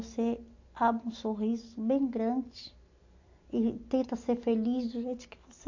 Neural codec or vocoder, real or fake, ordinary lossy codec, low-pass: none; real; AAC, 48 kbps; 7.2 kHz